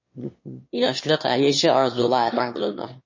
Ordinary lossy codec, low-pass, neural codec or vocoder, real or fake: MP3, 32 kbps; 7.2 kHz; autoencoder, 22.05 kHz, a latent of 192 numbers a frame, VITS, trained on one speaker; fake